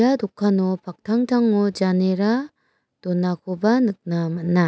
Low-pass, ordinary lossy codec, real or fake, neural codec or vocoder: none; none; real; none